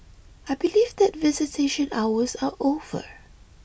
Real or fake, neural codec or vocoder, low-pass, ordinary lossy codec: real; none; none; none